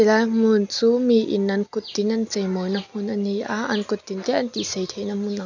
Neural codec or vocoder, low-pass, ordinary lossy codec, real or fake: none; 7.2 kHz; none; real